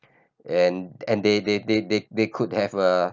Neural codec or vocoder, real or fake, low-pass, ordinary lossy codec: none; real; 7.2 kHz; none